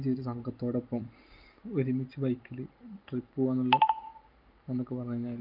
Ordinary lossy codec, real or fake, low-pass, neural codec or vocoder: Opus, 24 kbps; real; 5.4 kHz; none